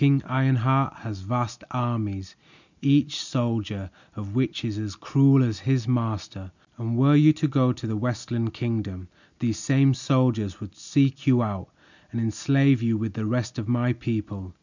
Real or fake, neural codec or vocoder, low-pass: real; none; 7.2 kHz